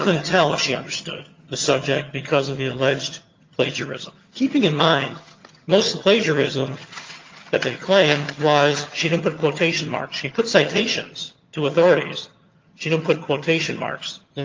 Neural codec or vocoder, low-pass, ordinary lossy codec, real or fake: vocoder, 22.05 kHz, 80 mel bands, HiFi-GAN; 7.2 kHz; Opus, 32 kbps; fake